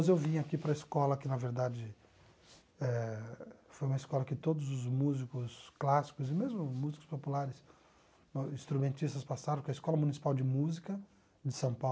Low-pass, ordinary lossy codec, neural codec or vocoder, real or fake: none; none; none; real